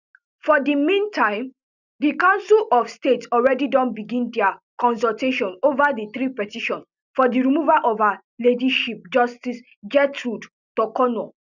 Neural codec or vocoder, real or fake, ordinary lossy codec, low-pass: none; real; none; 7.2 kHz